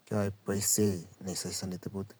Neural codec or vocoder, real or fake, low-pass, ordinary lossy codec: vocoder, 44.1 kHz, 128 mel bands every 512 samples, BigVGAN v2; fake; none; none